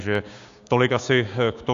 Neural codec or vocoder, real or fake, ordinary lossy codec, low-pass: codec, 16 kHz, 6 kbps, DAC; fake; AAC, 64 kbps; 7.2 kHz